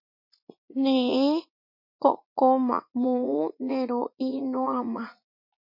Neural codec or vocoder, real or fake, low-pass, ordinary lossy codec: vocoder, 44.1 kHz, 80 mel bands, Vocos; fake; 5.4 kHz; MP3, 32 kbps